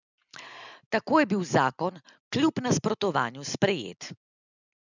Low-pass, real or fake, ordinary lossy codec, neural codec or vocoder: 7.2 kHz; real; none; none